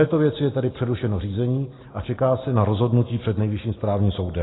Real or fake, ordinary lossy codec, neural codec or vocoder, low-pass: real; AAC, 16 kbps; none; 7.2 kHz